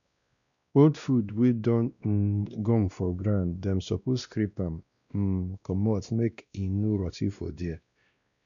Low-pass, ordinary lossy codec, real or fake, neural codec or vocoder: 7.2 kHz; none; fake; codec, 16 kHz, 1 kbps, X-Codec, WavLM features, trained on Multilingual LibriSpeech